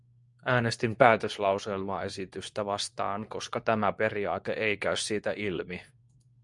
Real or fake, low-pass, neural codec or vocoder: fake; 10.8 kHz; codec, 24 kHz, 0.9 kbps, WavTokenizer, medium speech release version 2